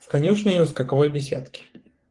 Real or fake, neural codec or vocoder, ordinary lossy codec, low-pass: fake; codec, 44.1 kHz, 3.4 kbps, Pupu-Codec; Opus, 24 kbps; 10.8 kHz